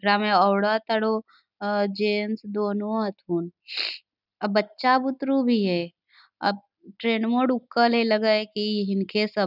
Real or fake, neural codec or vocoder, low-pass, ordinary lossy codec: real; none; 5.4 kHz; none